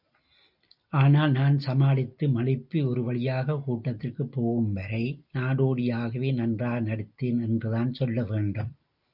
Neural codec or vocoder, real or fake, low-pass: none; real; 5.4 kHz